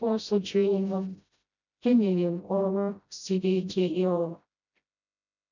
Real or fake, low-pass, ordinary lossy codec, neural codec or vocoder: fake; 7.2 kHz; none; codec, 16 kHz, 0.5 kbps, FreqCodec, smaller model